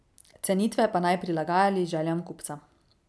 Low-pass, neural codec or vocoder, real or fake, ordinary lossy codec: none; none; real; none